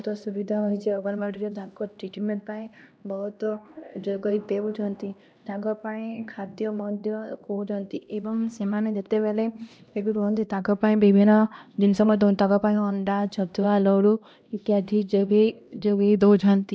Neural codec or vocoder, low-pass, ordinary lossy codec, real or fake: codec, 16 kHz, 1 kbps, X-Codec, HuBERT features, trained on LibriSpeech; none; none; fake